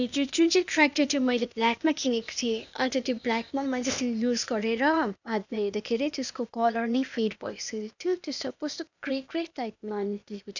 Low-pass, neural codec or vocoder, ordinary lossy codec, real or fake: 7.2 kHz; codec, 16 kHz, 0.8 kbps, ZipCodec; none; fake